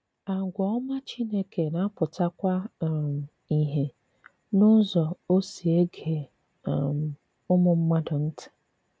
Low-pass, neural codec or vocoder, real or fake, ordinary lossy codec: none; none; real; none